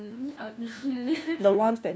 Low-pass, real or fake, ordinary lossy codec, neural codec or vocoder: none; fake; none; codec, 16 kHz, 1 kbps, FunCodec, trained on LibriTTS, 50 frames a second